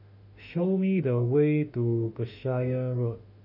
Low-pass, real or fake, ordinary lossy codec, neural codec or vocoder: 5.4 kHz; fake; none; autoencoder, 48 kHz, 32 numbers a frame, DAC-VAE, trained on Japanese speech